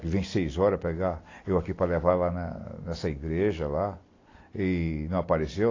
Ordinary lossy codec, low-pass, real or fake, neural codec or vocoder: AAC, 32 kbps; 7.2 kHz; real; none